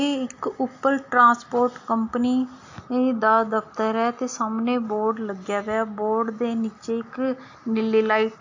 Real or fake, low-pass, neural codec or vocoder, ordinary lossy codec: real; 7.2 kHz; none; AAC, 48 kbps